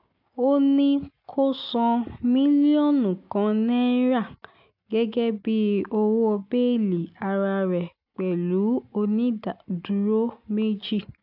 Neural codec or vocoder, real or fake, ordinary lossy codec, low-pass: none; real; AAC, 48 kbps; 5.4 kHz